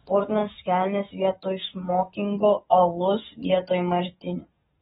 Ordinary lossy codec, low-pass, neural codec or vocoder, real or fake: AAC, 16 kbps; 19.8 kHz; codec, 44.1 kHz, 7.8 kbps, DAC; fake